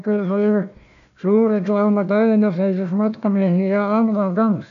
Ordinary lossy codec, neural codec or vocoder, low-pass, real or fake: none; codec, 16 kHz, 1 kbps, FunCodec, trained on Chinese and English, 50 frames a second; 7.2 kHz; fake